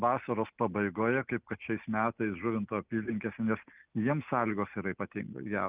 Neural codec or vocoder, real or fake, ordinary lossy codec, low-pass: none; real; Opus, 24 kbps; 3.6 kHz